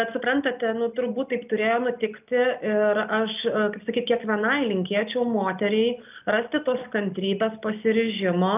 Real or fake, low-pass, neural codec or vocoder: real; 3.6 kHz; none